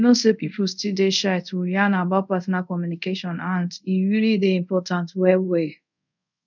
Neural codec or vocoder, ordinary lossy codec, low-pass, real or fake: codec, 24 kHz, 0.5 kbps, DualCodec; none; 7.2 kHz; fake